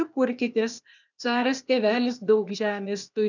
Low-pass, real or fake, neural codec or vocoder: 7.2 kHz; fake; codec, 16 kHz, 0.8 kbps, ZipCodec